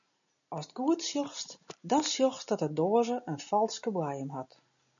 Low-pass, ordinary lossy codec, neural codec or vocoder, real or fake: 7.2 kHz; MP3, 96 kbps; none; real